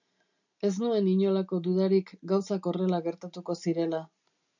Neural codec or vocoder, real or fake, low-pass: none; real; 7.2 kHz